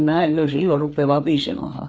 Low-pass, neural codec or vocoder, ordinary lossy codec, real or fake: none; codec, 16 kHz, 4 kbps, FreqCodec, larger model; none; fake